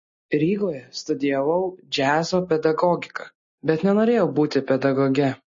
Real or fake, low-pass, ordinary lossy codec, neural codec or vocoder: real; 7.2 kHz; MP3, 32 kbps; none